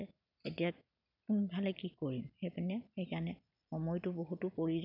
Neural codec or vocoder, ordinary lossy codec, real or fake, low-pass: none; none; real; 5.4 kHz